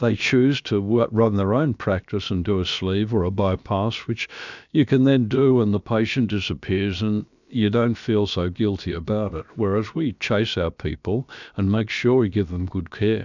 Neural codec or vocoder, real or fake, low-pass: codec, 16 kHz, about 1 kbps, DyCAST, with the encoder's durations; fake; 7.2 kHz